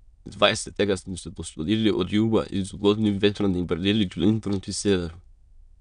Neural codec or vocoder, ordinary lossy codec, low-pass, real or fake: autoencoder, 22.05 kHz, a latent of 192 numbers a frame, VITS, trained on many speakers; none; 9.9 kHz; fake